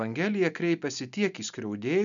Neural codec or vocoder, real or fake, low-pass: none; real; 7.2 kHz